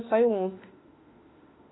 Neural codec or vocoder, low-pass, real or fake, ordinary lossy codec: autoencoder, 48 kHz, 32 numbers a frame, DAC-VAE, trained on Japanese speech; 7.2 kHz; fake; AAC, 16 kbps